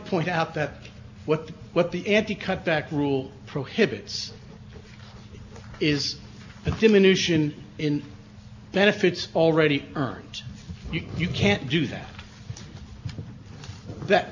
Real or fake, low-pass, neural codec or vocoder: real; 7.2 kHz; none